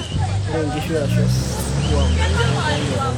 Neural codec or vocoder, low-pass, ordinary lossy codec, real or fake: none; none; none; real